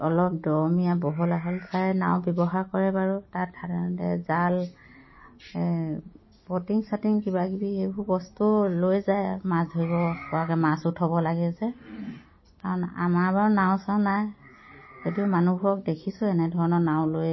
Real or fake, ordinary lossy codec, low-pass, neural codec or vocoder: real; MP3, 24 kbps; 7.2 kHz; none